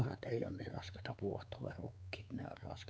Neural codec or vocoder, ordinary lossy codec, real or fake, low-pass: codec, 16 kHz, 4 kbps, X-Codec, WavLM features, trained on Multilingual LibriSpeech; none; fake; none